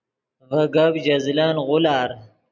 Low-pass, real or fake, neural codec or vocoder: 7.2 kHz; real; none